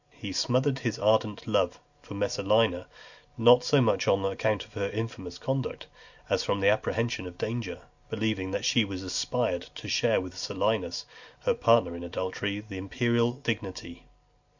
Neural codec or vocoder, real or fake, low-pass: none; real; 7.2 kHz